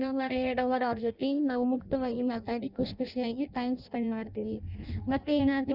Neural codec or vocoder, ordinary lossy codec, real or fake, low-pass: codec, 16 kHz in and 24 kHz out, 0.6 kbps, FireRedTTS-2 codec; none; fake; 5.4 kHz